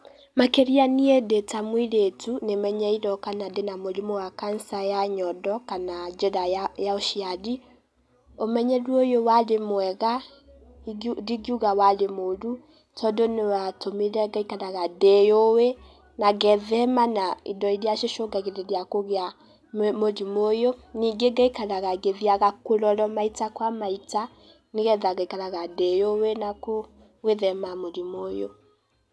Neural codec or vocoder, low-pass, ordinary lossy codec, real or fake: none; none; none; real